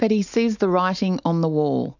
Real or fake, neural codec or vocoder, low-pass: real; none; 7.2 kHz